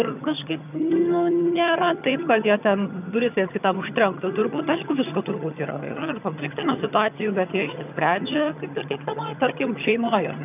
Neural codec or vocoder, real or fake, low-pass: vocoder, 22.05 kHz, 80 mel bands, HiFi-GAN; fake; 3.6 kHz